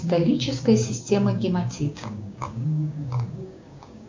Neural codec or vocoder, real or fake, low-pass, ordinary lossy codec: codec, 16 kHz, 6 kbps, DAC; fake; 7.2 kHz; MP3, 64 kbps